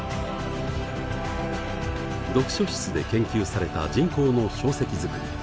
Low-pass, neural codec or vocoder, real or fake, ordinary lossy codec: none; none; real; none